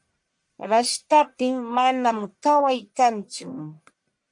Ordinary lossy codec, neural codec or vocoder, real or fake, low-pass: MP3, 64 kbps; codec, 44.1 kHz, 1.7 kbps, Pupu-Codec; fake; 10.8 kHz